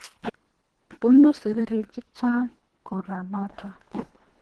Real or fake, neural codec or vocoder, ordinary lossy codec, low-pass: fake; codec, 24 kHz, 1.5 kbps, HILCodec; Opus, 16 kbps; 10.8 kHz